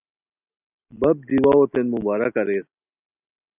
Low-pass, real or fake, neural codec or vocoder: 3.6 kHz; real; none